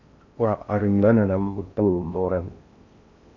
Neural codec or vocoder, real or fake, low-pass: codec, 16 kHz in and 24 kHz out, 0.6 kbps, FocalCodec, streaming, 4096 codes; fake; 7.2 kHz